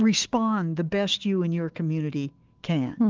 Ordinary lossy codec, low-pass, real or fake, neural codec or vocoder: Opus, 16 kbps; 7.2 kHz; fake; autoencoder, 48 kHz, 128 numbers a frame, DAC-VAE, trained on Japanese speech